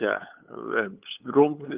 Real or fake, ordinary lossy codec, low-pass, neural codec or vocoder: fake; Opus, 32 kbps; 3.6 kHz; codec, 16 kHz, 8 kbps, FunCodec, trained on LibriTTS, 25 frames a second